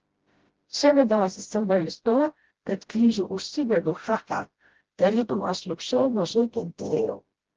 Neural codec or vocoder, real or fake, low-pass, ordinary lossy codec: codec, 16 kHz, 0.5 kbps, FreqCodec, smaller model; fake; 7.2 kHz; Opus, 16 kbps